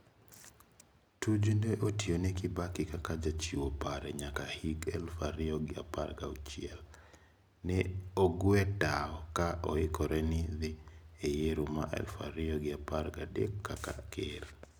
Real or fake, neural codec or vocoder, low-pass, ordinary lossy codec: real; none; none; none